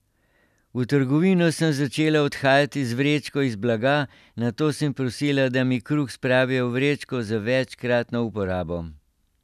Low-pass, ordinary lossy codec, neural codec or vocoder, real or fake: 14.4 kHz; none; none; real